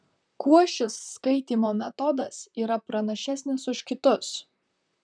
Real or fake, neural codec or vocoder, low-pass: fake; vocoder, 44.1 kHz, 128 mel bands, Pupu-Vocoder; 9.9 kHz